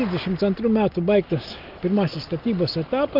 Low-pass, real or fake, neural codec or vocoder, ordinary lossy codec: 5.4 kHz; real; none; Opus, 32 kbps